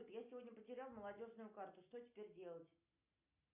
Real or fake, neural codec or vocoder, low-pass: real; none; 3.6 kHz